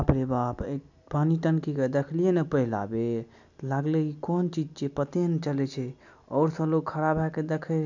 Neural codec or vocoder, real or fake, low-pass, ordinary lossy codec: none; real; 7.2 kHz; none